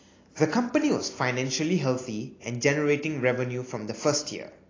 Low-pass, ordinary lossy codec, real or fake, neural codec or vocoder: 7.2 kHz; AAC, 32 kbps; real; none